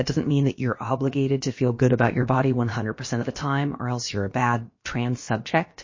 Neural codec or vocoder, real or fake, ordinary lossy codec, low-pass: codec, 16 kHz, about 1 kbps, DyCAST, with the encoder's durations; fake; MP3, 32 kbps; 7.2 kHz